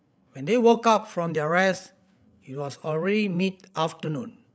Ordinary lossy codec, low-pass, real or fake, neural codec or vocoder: none; none; fake; codec, 16 kHz, 8 kbps, FreqCodec, larger model